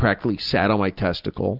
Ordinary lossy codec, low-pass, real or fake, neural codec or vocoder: Opus, 24 kbps; 5.4 kHz; real; none